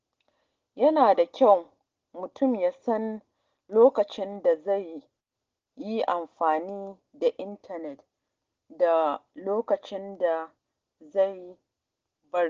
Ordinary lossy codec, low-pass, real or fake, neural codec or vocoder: Opus, 16 kbps; 7.2 kHz; real; none